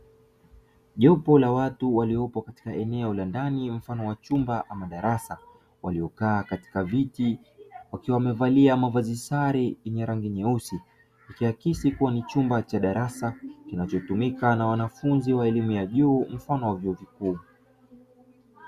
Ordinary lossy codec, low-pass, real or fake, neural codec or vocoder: Opus, 64 kbps; 14.4 kHz; real; none